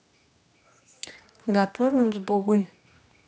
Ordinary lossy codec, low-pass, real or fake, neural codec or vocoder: none; none; fake; codec, 16 kHz, 1 kbps, X-Codec, HuBERT features, trained on general audio